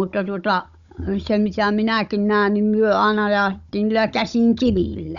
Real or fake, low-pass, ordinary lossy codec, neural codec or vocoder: fake; 7.2 kHz; Opus, 64 kbps; codec, 16 kHz, 16 kbps, FunCodec, trained on Chinese and English, 50 frames a second